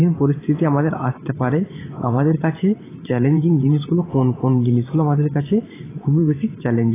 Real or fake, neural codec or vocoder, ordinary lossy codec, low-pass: fake; vocoder, 44.1 kHz, 128 mel bands every 512 samples, BigVGAN v2; AAC, 16 kbps; 3.6 kHz